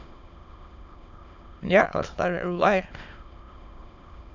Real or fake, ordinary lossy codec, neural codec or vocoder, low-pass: fake; none; autoencoder, 22.05 kHz, a latent of 192 numbers a frame, VITS, trained on many speakers; 7.2 kHz